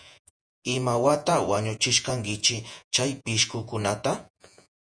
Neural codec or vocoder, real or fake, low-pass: vocoder, 48 kHz, 128 mel bands, Vocos; fake; 9.9 kHz